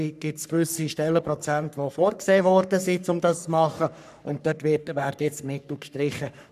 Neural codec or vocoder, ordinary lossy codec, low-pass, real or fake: codec, 44.1 kHz, 3.4 kbps, Pupu-Codec; none; 14.4 kHz; fake